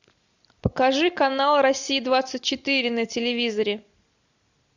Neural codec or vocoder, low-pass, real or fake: none; 7.2 kHz; real